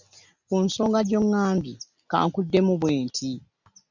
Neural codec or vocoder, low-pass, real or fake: none; 7.2 kHz; real